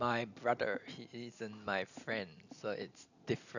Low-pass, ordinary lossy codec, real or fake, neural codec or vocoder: 7.2 kHz; none; real; none